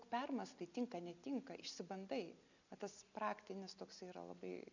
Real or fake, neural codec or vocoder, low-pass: real; none; 7.2 kHz